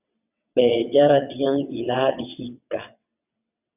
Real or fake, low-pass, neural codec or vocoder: fake; 3.6 kHz; vocoder, 22.05 kHz, 80 mel bands, WaveNeXt